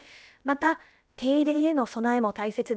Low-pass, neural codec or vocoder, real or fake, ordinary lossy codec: none; codec, 16 kHz, about 1 kbps, DyCAST, with the encoder's durations; fake; none